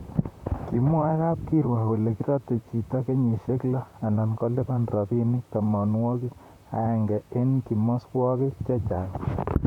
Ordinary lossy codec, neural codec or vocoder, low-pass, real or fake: none; vocoder, 44.1 kHz, 128 mel bands, Pupu-Vocoder; 19.8 kHz; fake